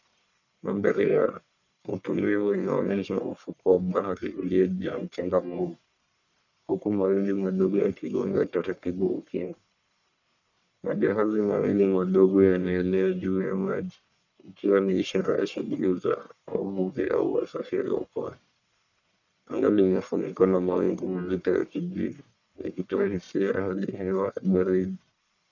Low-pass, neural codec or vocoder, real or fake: 7.2 kHz; codec, 44.1 kHz, 1.7 kbps, Pupu-Codec; fake